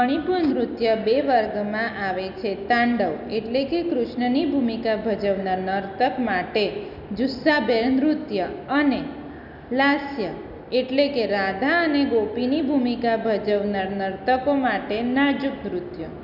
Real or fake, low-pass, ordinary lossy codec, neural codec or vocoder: real; 5.4 kHz; none; none